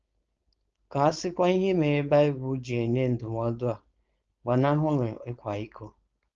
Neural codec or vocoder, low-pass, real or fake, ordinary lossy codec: codec, 16 kHz, 4.8 kbps, FACodec; 7.2 kHz; fake; Opus, 24 kbps